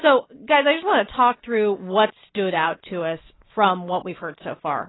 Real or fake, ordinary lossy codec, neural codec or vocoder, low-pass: real; AAC, 16 kbps; none; 7.2 kHz